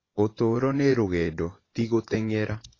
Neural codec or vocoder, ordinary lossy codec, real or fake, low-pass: vocoder, 24 kHz, 100 mel bands, Vocos; AAC, 32 kbps; fake; 7.2 kHz